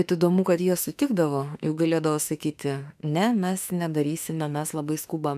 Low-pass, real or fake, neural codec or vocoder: 14.4 kHz; fake; autoencoder, 48 kHz, 32 numbers a frame, DAC-VAE, trained on Japanese speech